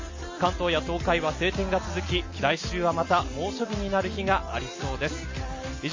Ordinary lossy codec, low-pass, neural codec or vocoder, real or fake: MP3, 32 kbps; 7.2 kHz; none; real